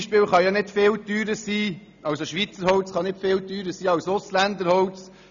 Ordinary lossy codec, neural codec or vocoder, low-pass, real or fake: none; none; 7.2 kHz; real